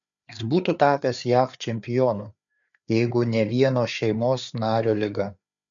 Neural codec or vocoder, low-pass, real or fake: codec, 16 kHz, 4 kbps, FreqCodec, larger model; 7.2 kHz; fake